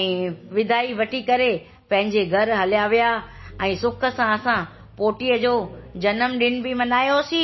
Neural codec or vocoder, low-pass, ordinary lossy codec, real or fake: none; 7.2 kHz; MP3, 24 kbps; real